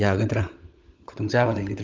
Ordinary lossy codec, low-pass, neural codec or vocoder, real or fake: Opus, 24 kbps; 7.2 kHz; codec, 16 kHz, 16 kbps, FunCodec, trained on LibriTTS, 50 frames a second; fake